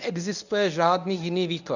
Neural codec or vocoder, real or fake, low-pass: codec, 24 kHz, 0.9 kbps, WavTokenizer, medium speech release version 1; fake; 7.2 kHz